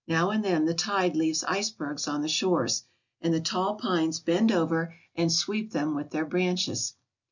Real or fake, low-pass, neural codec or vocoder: real; 7.2 kHz; none